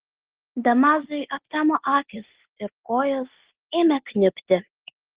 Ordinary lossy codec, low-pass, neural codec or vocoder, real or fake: Opus, 16 kbps; 3.6 kHz; none; real